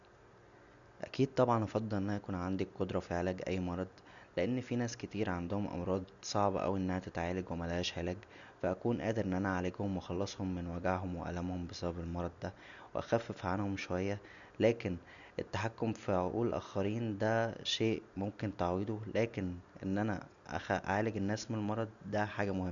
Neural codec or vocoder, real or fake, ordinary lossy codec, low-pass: none; real; none; 7.2 kHz